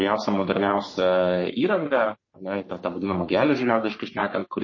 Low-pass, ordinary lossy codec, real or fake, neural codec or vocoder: 7.2 kHz; MP3, 32 kbps; fake; codec, 44.1 kHz, 3.4 kbps, Pupu-Codec